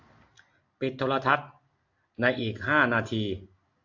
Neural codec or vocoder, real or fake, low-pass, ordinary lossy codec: none; real; 7.2 kHz; AAC, 48 kbps